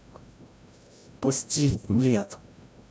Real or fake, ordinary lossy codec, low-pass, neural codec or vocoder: fake; none; none; codec, 16 kHz, 0.5 kbps, FreqCodec, larger model